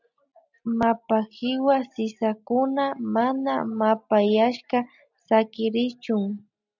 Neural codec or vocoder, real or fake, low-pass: none; real; 7.2 kHz